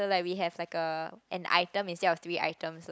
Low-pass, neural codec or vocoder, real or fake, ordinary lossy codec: none; none; real; none